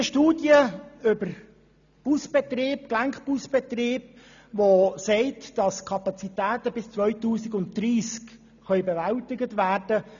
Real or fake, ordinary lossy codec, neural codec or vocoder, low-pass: real; none; none; 7.2 kHz